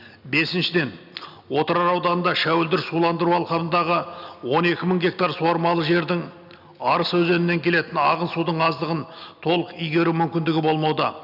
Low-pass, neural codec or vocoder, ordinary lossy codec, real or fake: 5.4 kHz; none; none; real